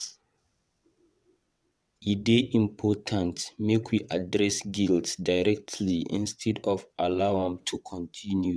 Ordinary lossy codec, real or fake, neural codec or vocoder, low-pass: none; fake; vocoder, 22.05 kHz, 80 mel bands, WaveNeXt; none